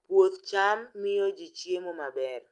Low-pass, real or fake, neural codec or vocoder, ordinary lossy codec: 10.8 kHz; real; none; Opus, 32 kbps